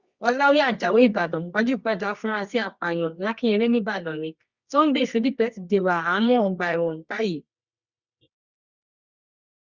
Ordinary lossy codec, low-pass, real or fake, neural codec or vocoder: Opus, 64 kbps; 7.2 kHz; fake; codec, 24 kHz, 0.9 kbps, WavTokenizer, medium music audio release